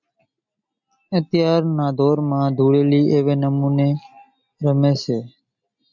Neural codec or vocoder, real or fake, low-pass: none; real; 7.2 kHz